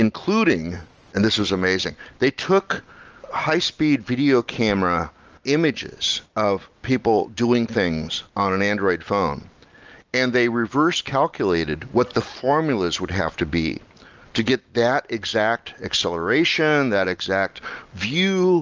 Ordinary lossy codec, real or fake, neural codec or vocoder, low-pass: Opus, 24 kbps; real; none; 7.2 kHz